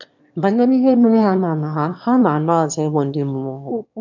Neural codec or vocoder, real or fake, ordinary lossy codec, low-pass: autoencoder, 22.05 kHz, a latent of 192 numbers a frame, VITS, trained on one speaker; fake; none; 7.2 kHz